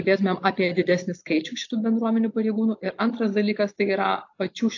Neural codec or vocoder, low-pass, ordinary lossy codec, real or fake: none; 7.2 kHz; AAC, 48 kbps; real